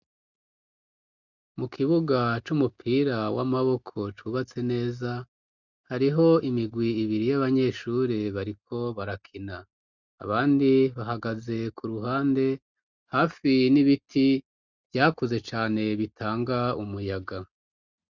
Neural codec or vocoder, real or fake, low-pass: none; real; 7.2 kHz